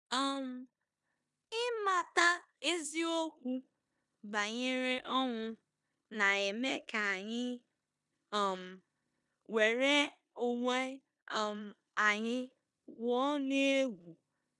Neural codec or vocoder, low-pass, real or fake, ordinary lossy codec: codec, 16 kHz in and 24 kHz out, 0.9 kbps, LongCat-Audio-Codec, four codebook decoder; 10.8 kHz; fake; none